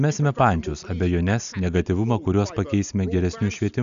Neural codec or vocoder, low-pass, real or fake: none; 7.2 kHz; real